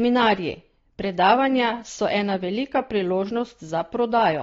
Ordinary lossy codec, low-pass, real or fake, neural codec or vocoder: AAC, 32 kbps; 7.2 kHz; real; none